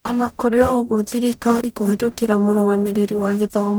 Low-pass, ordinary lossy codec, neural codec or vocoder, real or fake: none; none; codec, 44.1 kHz, 0.9 kbps, DAC; fake